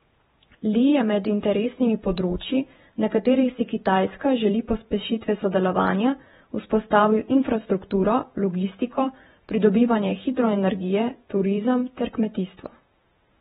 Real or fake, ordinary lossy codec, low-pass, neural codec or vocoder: fake; AAC, 16 kbps; 19.8 kHz; vocoder, 48 kHz, 128 mel bands, Vocos